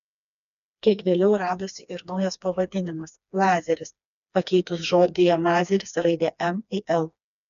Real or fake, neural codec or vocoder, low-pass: fake; codec, 16 kHz, 2 kbps, FreqCodec, smaller model; 7.2 kHz